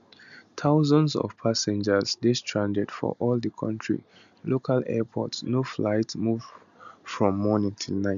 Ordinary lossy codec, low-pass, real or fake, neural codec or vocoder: none; 7.2 kHz; real; none